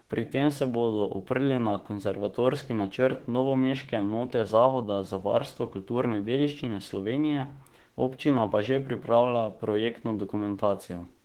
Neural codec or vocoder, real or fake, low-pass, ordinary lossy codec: autoencoder, 48 kHz, 32 numbers a frame, DAC-VAE, trained on Japanese speech; fake; 19.8 kHz; Opus, 16 kbps